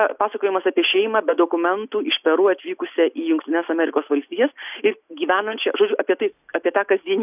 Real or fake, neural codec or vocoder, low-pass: real; none; 3.6 kHz